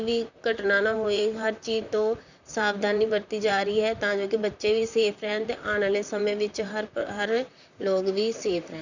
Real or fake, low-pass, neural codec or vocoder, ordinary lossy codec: fake; 7.2 kHz; vocoder, 44.1 kHz, 128 mel bands, Pupu-Vocoder; none